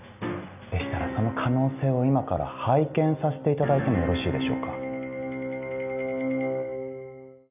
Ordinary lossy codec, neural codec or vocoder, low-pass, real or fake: none; none; 3.6 kHz; real